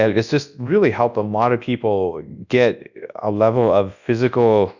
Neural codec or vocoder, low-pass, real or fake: codec, 24 kHz, 0.9 kbps, WavTokenizer, large speech release; 7.2 kHz; fake